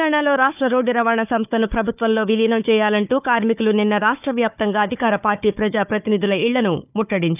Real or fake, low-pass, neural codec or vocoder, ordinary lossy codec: fake; 3.6 kHz; codec, 16 kHz, 4 kbps, FunCodec, trained on Chinese and English, 50 frames a second; none